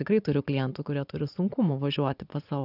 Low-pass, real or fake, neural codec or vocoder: 5.4 kHz; fake; vocoder, 24 kHz, 100 mel bands, Vocos